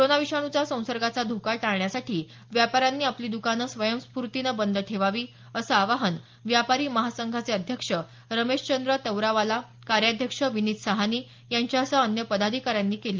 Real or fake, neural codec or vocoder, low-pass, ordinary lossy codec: real; none; 7.2 kHz; Opus, 32 kbps